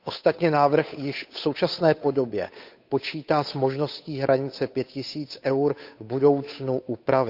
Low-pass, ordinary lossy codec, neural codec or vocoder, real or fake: 5.4 kHz; none; codec, 16 kHz, 8 kbps, FunCodec, trained on Chinese and English, 25 frames a second; fake